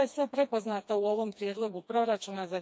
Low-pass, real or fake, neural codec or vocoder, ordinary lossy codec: none; fake; codec, 16 kHz, 2 kbps, FreqCodec, smaller model; none